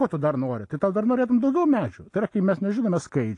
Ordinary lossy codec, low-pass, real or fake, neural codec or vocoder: AAC, 48 kbps; 10.8 kHz; real; none